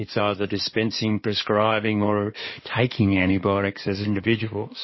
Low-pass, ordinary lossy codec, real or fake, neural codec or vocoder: 7.2 kHz; MP3, 24 kbps; fake; codec, 16 kHz in and 24 kHz out, 2.2 kbps, FireRedTTS-2 codec